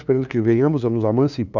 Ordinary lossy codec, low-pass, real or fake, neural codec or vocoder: none; 7.2 kHz; fake; codec, 16 kHz, 2 kbps, FunCodec, trained on LibriTTS, 25 frames a second